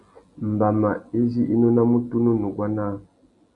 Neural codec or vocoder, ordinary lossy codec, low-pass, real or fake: none; AAC, 48 kbps; 10.8 kHz; real